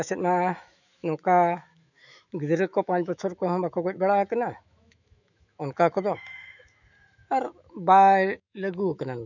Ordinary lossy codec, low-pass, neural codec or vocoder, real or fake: none; 7.2 kHz; autoencoder, 48 kHz, 128 numbers a frame, DAC-VAE, trained on Japanese speech; fake